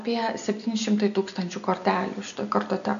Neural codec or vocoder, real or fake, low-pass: none; real; 7.2 kHz